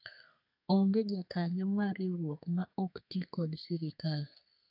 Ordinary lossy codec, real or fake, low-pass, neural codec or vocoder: AAC, 48 kbps; fake; 5.4 kHz; codec, 32 kHz, 1.9 kbps, SNAC